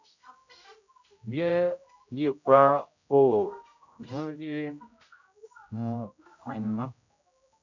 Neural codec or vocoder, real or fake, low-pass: codec, 16 kHz, 0.5 kbps, X-Codec, HuBERT features, trained on general audio; fake; 7.2 kHz